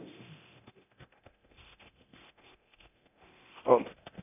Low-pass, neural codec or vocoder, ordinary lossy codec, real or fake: 3.6 kHz; codec, 24 kHz, 0.9 kbps, DualCodec; none; fake